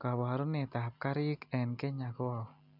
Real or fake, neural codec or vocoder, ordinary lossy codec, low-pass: real; none; none; 5.4 kHz